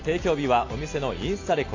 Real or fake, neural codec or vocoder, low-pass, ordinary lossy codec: real; none; 7.2 kHz; AAC, 48 kbps